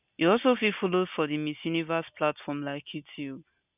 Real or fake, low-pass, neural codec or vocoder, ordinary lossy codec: real; 3.6 kHz; none; none